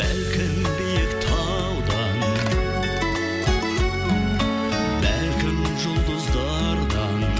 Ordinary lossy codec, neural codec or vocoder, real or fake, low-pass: none; none; real; none